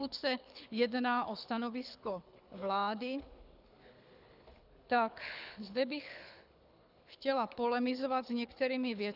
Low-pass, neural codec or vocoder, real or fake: 5.4 kHz; codec, 24 kHz, 6 kbps, HILCodec; fake